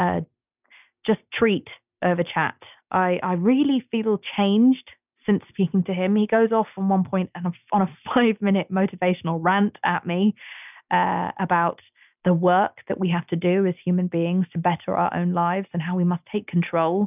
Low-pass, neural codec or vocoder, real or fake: 3.6 kHz; none; real